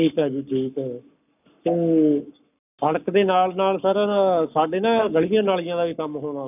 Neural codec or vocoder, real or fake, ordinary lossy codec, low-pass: codec, 44.1 kHz, 7.8 kbps, Pupu-Codec; fake; none; 3.6 kHz